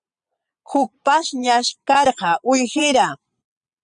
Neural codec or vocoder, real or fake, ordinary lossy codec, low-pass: vocoder, 22.05 kHz, 80 mel bands, Vocos; fake; Opus, 64 kbps; 9.9 kHz